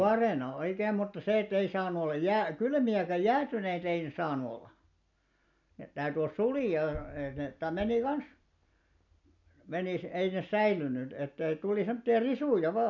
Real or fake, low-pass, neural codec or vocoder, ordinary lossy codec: real; 7.2 kHz; none; none